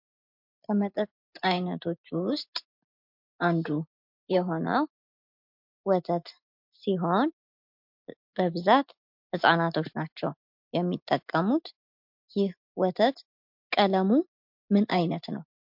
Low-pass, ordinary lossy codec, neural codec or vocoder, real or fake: 5.4 kHz; MP3, 48 kbps; none; real